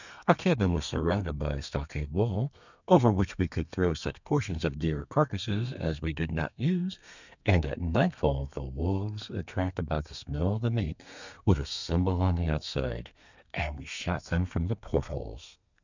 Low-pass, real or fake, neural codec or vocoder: 7.2 kHz; fake; codec, 32 kHz, 1.9 kbps, SNAC